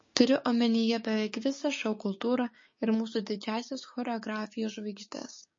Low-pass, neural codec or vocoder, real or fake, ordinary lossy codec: 7.2 kHz; codec, 16 kHz, 6 kbps, DAC; fake; MP3, 32 kbps